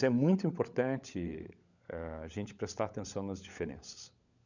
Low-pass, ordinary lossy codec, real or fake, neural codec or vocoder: 7.2 kHz; none; fake; codec, 16 kHz, 16 kbps, FunCodec, trained on LibriTTS, 50 frames a second